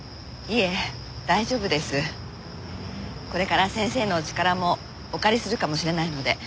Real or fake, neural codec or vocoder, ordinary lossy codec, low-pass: real; none; none; none